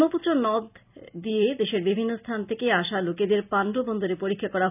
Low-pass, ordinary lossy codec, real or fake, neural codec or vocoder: 3.6 kHz; none; real; none